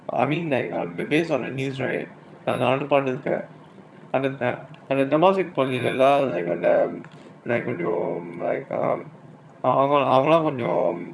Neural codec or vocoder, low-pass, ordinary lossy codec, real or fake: vocoder, 22.05 kHz, 80 mel bands, HiFi-GAN; none; none; fake